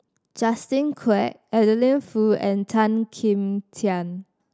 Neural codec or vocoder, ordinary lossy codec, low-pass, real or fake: none; none; none; real